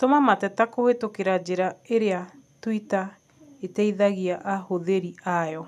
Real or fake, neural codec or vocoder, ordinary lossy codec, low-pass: real; none; none; 14.4 kHz